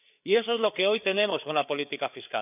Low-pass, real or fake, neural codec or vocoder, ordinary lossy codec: 3.6 kHz; fake; codec, 16 kHz, 4 kbps, FreqCodec, larger model; none